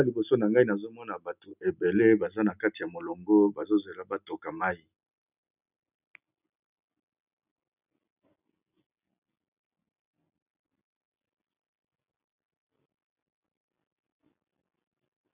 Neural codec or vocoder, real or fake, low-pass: none; real; 3.6 kHz